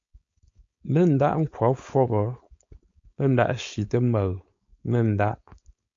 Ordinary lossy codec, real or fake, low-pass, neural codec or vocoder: MP3, 48 kbps; fake; 7.2 kHz; codec, 16 kHz, 4.8 kbps, FACodec